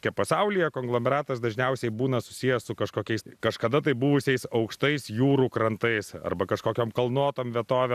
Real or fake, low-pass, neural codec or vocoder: real; 14.4 kHz; none